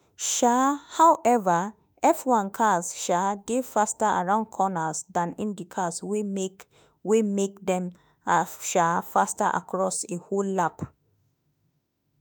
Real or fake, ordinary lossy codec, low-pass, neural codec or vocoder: fake; none; none; autoencoder, 48 kHz, 32 numbers a frame, DAC-VAE, trained on Japanese speech